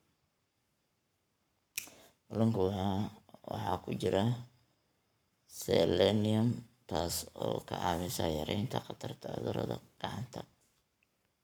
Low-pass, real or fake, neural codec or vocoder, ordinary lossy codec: none; fake; codec, 44.1 kHz, 7.8 kbps, Pupu-Codec; none